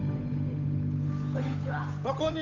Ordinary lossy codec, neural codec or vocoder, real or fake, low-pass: none; codec, 16 kHz, 8 kbps, FunCodec, trained on Chinese and English, 25 frames a second; fake; 7.2 kHz